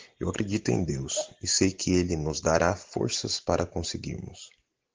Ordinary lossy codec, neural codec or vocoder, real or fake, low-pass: Opus, 16 kbps; none; real; 7.2 kHz